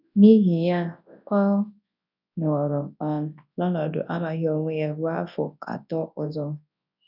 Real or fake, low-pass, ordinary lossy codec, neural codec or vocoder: fake; 5.4 kHz; none; codec, 24 kHz, 0.9 kbps, WavTokenizer, large speech release